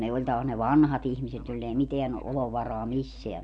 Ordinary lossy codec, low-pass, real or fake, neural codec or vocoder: none; 9.9 kHz; real; none